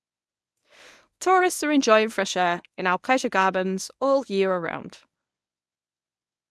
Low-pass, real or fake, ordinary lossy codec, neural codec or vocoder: none; fake; none; codec, 24 kHz, 0.9 kbps, WavTokenizer, medium speech release version 1